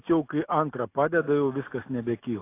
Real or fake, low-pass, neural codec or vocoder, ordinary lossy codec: real; 3.6 kHz; none; AAC, 24 kbps